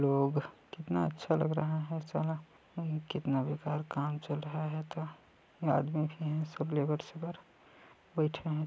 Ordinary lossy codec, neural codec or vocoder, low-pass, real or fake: none; none; none; real